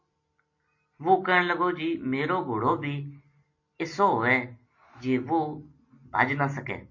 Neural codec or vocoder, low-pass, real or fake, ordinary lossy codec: none; 7.2 kHz; real; MP3, 32 kbps